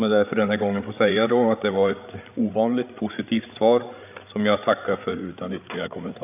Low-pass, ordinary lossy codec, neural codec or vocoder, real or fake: 3.6 kHz; none; codec, 16 kHz, 8 kbps, FreqCodec, larger model; fake